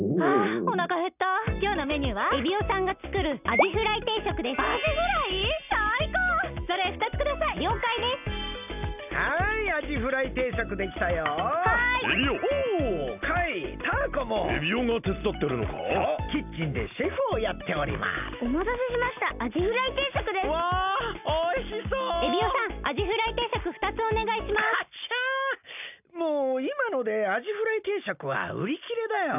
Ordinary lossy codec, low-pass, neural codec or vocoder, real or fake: none; 3.6 kHz; none; real